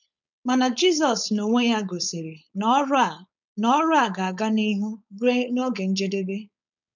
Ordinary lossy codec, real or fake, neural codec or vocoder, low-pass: none; fake; codec, 16 kHz, 4.8 kbps, FACodec; 7.2 kHz